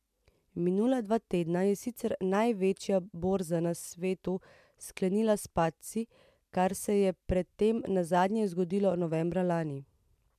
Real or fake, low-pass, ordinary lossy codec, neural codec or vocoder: real; 14.4 kHz; MP3, 96 kbps; none